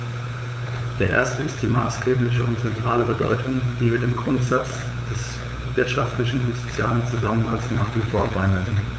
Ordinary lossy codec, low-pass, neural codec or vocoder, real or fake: none; none; codec, 16 kHz, 8 kbps, FunCodec, trained on LibriTTS, 25 frames a second; fake